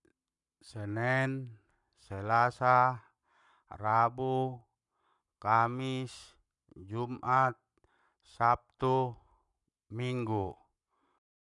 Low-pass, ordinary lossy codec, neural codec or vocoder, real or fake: 10.8 kHz; none; codec, 44.1 kHz, 7.8 kbps, Pupu-Codec; fake